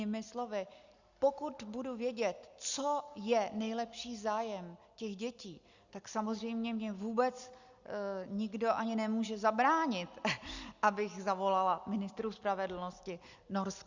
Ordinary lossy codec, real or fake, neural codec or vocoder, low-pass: Opus, 64 kbps; real; none; 7.2 kHz